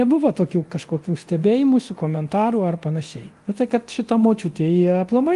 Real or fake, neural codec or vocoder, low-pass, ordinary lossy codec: fake; codec, 24 kHz, 0.9 kbps, DualCodec; 10.8 kHz; Opus, 24 kbps